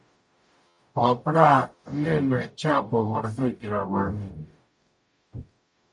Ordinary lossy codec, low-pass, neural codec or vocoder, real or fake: MP3, 48 kbps; 10.8 kHz; codec, 44.1 kHz, 0.9 kbps, DAC; fake